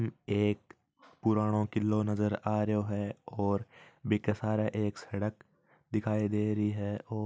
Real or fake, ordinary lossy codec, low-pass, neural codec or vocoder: real; none; none; none